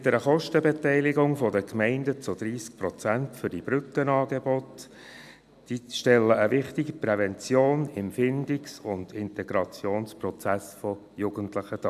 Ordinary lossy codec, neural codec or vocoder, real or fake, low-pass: MP3, 96 kbps; none; real; 14.4 kHz